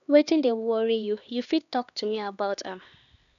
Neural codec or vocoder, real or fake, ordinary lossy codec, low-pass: codec, 16 kHz, 2 kbps, X-Codec, HuBERT features, trained on LibriSpeech; fake; none; 7.2 kHz